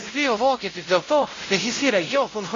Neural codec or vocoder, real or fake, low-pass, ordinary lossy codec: codec, 16 kHz, 0.5 kbps, X-Codec, WavLM features, trained on Multilingual LibriSpeech; fake; 7.2 kHz; AAC, 32 kbps